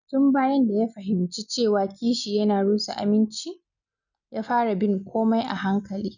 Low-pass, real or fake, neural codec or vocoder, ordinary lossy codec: 7.2 kHz; real; none; none